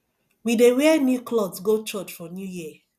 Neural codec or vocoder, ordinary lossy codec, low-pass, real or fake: none; none; 14.4 kHz; real